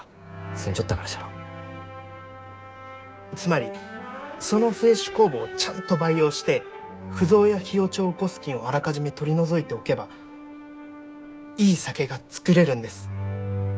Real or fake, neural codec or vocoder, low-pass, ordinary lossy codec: fake; codec, 16 kHz, 6 kbps, DAC; none; none